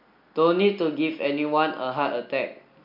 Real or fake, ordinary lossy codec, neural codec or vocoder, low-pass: real; MP3, 48 kbps; none; 5.4 kHz